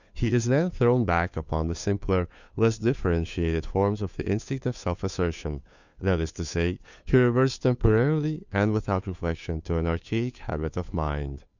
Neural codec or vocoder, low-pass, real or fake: codec, 16 kHz, 2 kbps, FunCodec, trained on Chinese and English, 25 frames a second; 7.2 kHz; fake